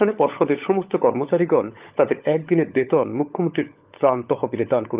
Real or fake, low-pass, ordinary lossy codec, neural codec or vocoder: fake; 3.6 kHz; Opus, 24 kbps; codec, 16 kHz, 16 kbps, FunCodec, trained on LibriTTS, 50 frames a second